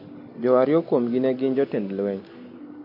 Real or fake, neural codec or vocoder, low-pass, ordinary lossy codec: real; none; 5.4 kHz; MP3, 32 kbps